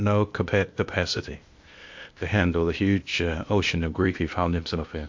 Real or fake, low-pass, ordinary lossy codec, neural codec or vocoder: fake; 7.2 kHz; MP3, 48 kbps; codec, 16 kHz, 0.8 kbps, ZipCodec